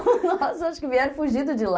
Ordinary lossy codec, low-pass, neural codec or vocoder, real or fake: none; none; none; real